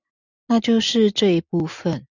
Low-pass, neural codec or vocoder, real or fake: 7.2 kHz; none; real